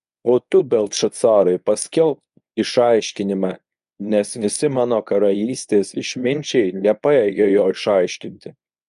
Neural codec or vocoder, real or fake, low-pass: codec, 24 kHz, 0.9 kbps, WavTokenizer, medium speech release version 1; fake; 10.8 kHz